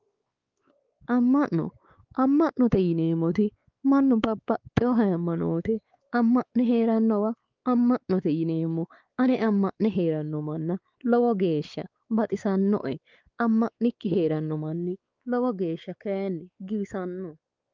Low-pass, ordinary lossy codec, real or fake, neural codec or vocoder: 7.2 kHz; Opus, 24 kbps; fake; codec, 16 kHz, 4 kbps, X-Codec, WavLM features, trained on Multilingual LibriSpeech